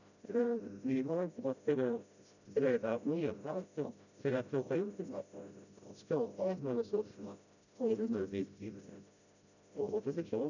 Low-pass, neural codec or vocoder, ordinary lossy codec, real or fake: 7.2 kHz; codec, 16 kHz, 0.5 kbps, FreqCodec, smaller model; none; fake